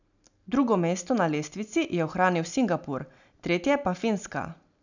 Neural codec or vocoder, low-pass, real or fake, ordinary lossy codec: none; 7.2 kHz; real; none